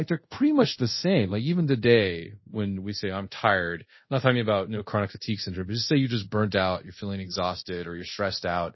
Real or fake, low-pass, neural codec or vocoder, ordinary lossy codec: fake; 7.2 kHz; codec, 24 kHz, 0.5 kbps, DualCodec; MP3, 24 kbps